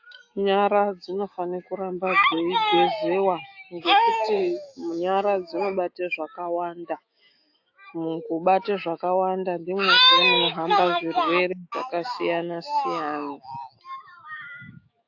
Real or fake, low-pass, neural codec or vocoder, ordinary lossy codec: fake; 7.2 kHz; autoencoder, 48 kHz, 128 numbers a frame, DAC-VAE, trained on Japanese speech; AAC, 48 kbps